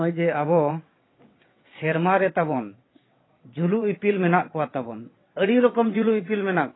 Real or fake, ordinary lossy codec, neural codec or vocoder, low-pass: fake; AAC, 16 kbps; vocoder, 22.05 kHz, 80 mel bands, WaveNeXt; 7.2 kHz